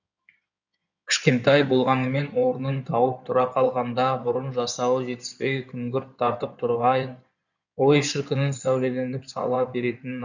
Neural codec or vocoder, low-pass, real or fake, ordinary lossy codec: codec, 16 kHz in and 24 kHz out, 2.2 kbps, FireRedTTS-2 codec; 7.2 kHz; fake; none